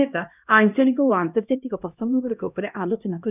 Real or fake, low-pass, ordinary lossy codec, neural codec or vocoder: fake; 3.6 kHz; none; codec, 16 kHz, 1 kbps, X-Codec, HuBERT features, trained on LibriSpeech